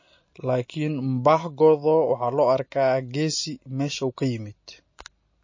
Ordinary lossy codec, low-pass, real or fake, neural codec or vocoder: MP3, 32 kbps; 7.2 kHz; real; none